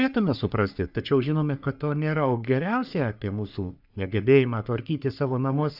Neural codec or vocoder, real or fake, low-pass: codec, 44.1 kHz, 3.4 kbps, Pupu-Codec; fake; 5.4 kHz